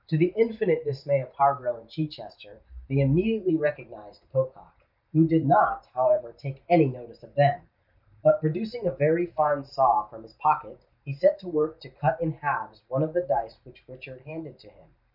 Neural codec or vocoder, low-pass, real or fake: vocoder, 44.1 kHz, 128 mel bands every 512 samples, BigVGAN v2; 5.4 kHz; fake